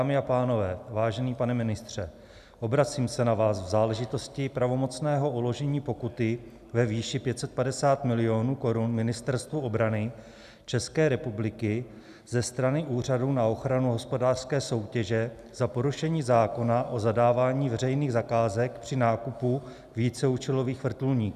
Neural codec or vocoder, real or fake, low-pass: none; real; 14.4 kHz